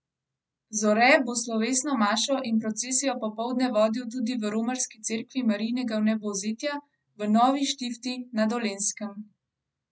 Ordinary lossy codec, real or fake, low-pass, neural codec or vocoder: none; real; none; none